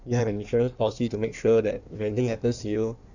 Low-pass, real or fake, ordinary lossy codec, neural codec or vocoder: 7.2 kHz; fake; none; codec, 16 kHz in and 24 kHz out, 1.1 kbps, FireRedTTS-2 codec